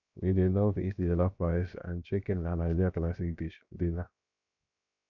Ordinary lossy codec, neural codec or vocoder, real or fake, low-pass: none; codec, 16 kHz, 0.7 kbps, FocalCodec; fake; 7.2 kHz